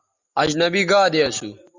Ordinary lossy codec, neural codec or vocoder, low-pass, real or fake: Opus, 64 kbps; none; 7.2 kHz; real